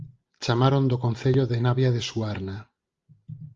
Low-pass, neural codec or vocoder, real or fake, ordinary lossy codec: 7.2 kHz; none; real; Opus, 32 kbps